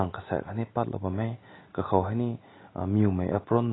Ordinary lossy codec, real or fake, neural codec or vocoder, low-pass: AAC, 16 kbps; real; none; 7.2 kHz